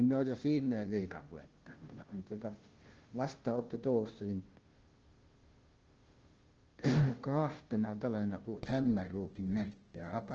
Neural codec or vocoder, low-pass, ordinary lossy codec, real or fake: codec, 16 kHz, 0.5 kbps, FunCodec, trained on Chinese and English, 25 frames a second; 7.2 kHz; Opus, 16 kbps; fake